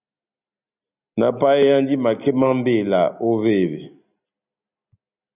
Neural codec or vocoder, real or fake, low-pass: none; real; 3.6 kHz